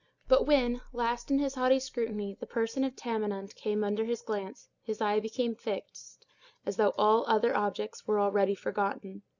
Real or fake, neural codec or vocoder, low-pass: real; none; 7.2 kHz